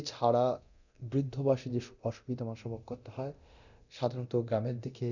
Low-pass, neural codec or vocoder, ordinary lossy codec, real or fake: 7.2 kHz; codec, 24 kHz, 0.9 kbps, DualCodec; none; fake